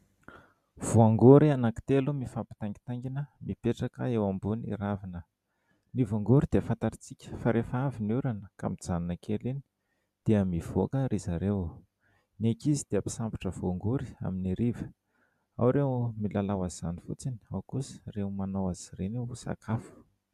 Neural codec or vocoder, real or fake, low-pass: none; real; 14.4 kHz